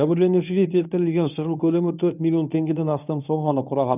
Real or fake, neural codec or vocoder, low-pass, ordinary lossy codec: fake; codec, 24 kHz, 0.9 kbps, WavTokenizer, medium speech release version 1; 3.6 kHz; none